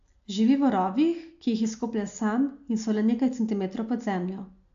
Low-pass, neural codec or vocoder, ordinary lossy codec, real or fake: 7.2 kHz; none; AAC, 64 kbps; real